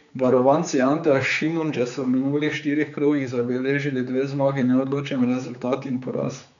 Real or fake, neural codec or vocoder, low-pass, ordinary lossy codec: fake; codec, 16 kHz, 4 kbps, X-Codec, HuBERT features, trained on general audio; 7.2 kHz; none